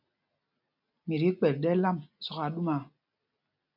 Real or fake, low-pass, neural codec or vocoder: real; 5.4 kHz; none